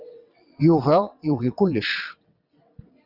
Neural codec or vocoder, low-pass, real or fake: vocoder, 22.05 kHz, 80 mel bands, WaveNeXt; 5.4 kHz; fake